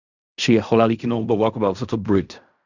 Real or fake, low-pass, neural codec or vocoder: fake; 7.2 kHz; codec, 16 kHz in and 24 kHz out, 0.4 kbps, LongCat-Audio-Codec, fine tuned four codebook decoder